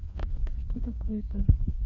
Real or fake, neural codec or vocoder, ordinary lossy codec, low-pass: fake; codec, 16 kHz, 2 kbps, FreqCodec, larger model; none; 7.2 kHz